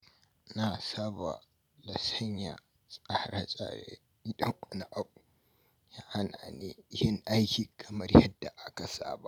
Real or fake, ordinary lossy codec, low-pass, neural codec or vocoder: real; none; none; none